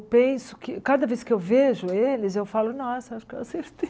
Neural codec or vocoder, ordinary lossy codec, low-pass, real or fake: none; none; none; real